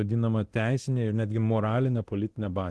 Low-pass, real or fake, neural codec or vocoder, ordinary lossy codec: 10.8 kHz; fake; codec, 24 kHz, 0.9 kbps, DualCodec; Opus, 16 kbps